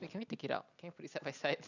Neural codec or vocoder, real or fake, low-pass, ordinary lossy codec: none; real; 7.2 kHz; none